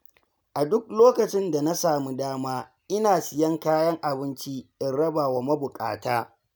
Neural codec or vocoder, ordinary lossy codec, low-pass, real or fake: none; none; none; real